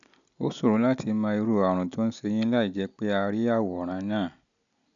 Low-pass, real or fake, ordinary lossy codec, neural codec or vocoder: 7.2 kHz; real; none; none